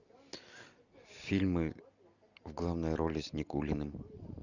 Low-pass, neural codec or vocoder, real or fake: 7.2 kHz; none; real